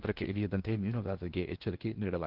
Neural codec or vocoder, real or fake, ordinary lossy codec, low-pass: codec, 16 kHz in and 24 kHz out, 0.8 kbps, FocalCodec, streaming, 65536 codes; fake; Opus, 16 kbps; 5.4 kHz